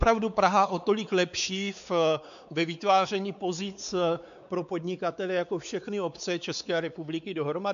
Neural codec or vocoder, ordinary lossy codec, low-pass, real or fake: codec, 16 kHz, 4 kbps, X-Codec, WavLM features, trained on Multilingual LibriSpeech; MP3, 96 kbps; 7.2 kHz; fake